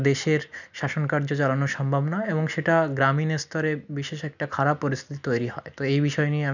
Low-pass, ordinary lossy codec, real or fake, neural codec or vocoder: 7.2 kHz; none; real; none